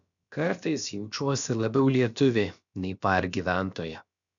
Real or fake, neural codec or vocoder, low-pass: fake; codec, 16 kHz, about 1 kbps, DyCAST, with the encoder's durations; 7.2 kHz